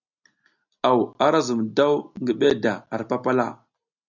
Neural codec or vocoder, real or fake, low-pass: none; real; 7.2 kHz